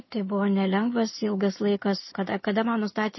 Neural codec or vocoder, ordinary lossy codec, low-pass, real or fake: none; MP3, 24 kbps; 7.2 kHz; real